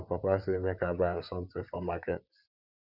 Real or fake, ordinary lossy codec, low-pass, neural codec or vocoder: fake; Opus, 64 kbps; 5.4 kHz; vocoder, 44.1 kHz, 128 mel bands, Pupu-Vocoder